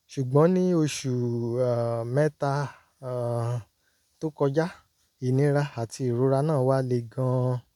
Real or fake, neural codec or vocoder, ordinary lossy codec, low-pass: real; none; none; 19.8 kHz